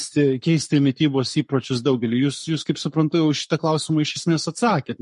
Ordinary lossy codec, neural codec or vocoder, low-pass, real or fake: MP3, 48 kbps; codec, 44.1 kHz, 7.8 kbps, Pupu-Codec; 14.4 kHz; fake